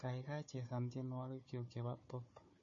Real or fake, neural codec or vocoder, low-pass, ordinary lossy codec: fake; codec, 16 kHz, 4 kbps, FunCodec, trained on Chinese and English, 50 frames a second; 7.2 kHz; MP3, 32 kbps